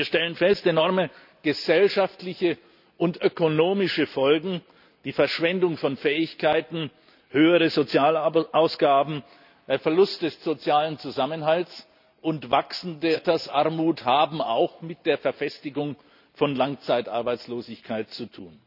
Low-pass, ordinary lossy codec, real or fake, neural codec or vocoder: 5.4 kHz; none; real; none